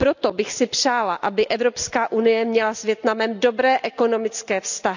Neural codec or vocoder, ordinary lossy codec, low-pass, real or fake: none; none; 7.2 kHz; real